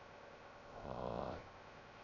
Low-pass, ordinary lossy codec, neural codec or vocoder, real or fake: 7.2 kHz; none; codec, 16 kHz, 0.3 kbps, FocalCodec; fake